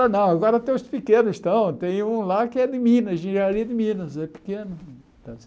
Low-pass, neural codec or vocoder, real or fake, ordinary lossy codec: none; none; real; none